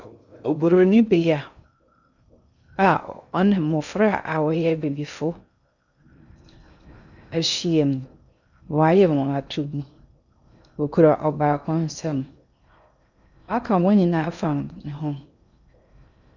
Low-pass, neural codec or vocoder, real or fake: 7.2 kHz; codec, 16 kHz in and 24 kHz out, 0.6 kbps, FocalCodec, streaming, 2048 codes; fake